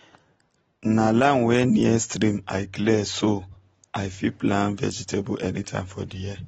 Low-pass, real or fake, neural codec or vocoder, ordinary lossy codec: 10.8 kHz; real; none; AAC, 24 kbps